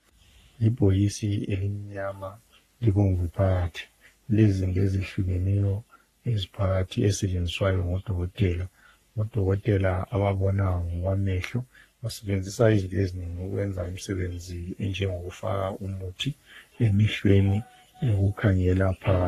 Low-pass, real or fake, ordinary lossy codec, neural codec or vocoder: 14.4 kHz; fake; AAC, 48 kbps; codec, 44.1 kHz, 3.4 kbps, Pupu-Codec